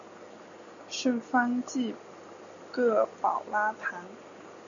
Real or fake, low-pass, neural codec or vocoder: real; 7.2 kHz; none